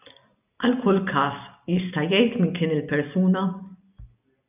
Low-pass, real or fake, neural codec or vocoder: 3.6 kHz; real; none